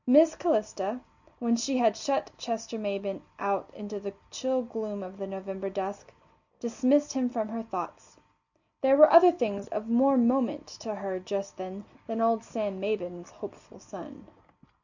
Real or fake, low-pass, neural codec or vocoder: real; 7.2 kHz; none